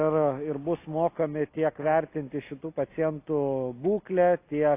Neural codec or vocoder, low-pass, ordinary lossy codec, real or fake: none; 3.6 kHz; AAC, 24 kbps; real